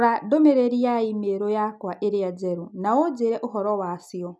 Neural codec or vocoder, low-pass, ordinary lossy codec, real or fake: none; none; none; real